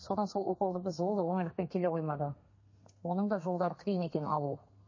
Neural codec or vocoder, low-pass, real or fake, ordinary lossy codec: codec, 32 kHz, 1.9 kbps, SNAC; 7.2 kHz; fake; MP3, 32 kbps